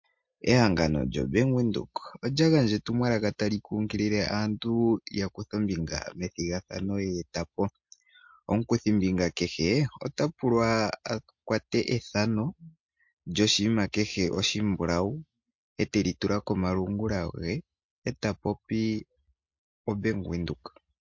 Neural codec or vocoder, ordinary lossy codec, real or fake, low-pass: none; MP3, 48 kbps; real; 7.2 kHz